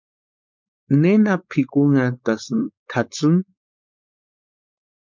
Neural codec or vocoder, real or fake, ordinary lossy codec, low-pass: codec, 16 kHz, 4.8 kbps, FACodec; fake; MP3, 64 kbps; 7.2 kHz